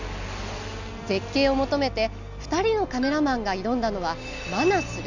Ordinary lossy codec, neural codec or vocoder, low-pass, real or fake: none; none; 7.2 kHz; real